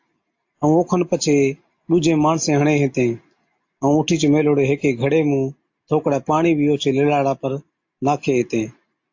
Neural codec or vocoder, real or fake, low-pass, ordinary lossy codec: none; real; 7.2 kHz; AAC, 48 kbps